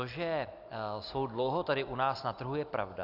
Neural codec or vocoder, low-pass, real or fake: none; 5.4 kHz; real